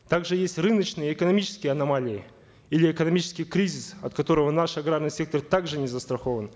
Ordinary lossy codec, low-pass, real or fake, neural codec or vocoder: none; none; real; none